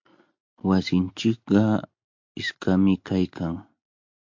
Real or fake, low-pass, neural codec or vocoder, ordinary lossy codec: real; 7.2 kHz; none; MP3, 48 kbps